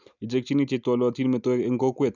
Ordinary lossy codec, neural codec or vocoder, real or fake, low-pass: none; none; real; 7.2 kHz